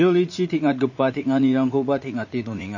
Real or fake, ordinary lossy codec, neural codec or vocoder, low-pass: fake; MP3, 32 kbps; vocoder, 44.1 kHz, 80 mel bands, Vocos; 7.2 kHz